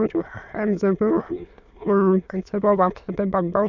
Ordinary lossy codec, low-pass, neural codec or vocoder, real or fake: none; 7.2 kHz; autoencoder, 22.05 kHz, a latent of 192 numbers a frame, VITS, trained on many speakers; fake